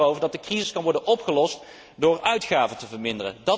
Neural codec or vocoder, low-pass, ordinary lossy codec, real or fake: none; none; none; real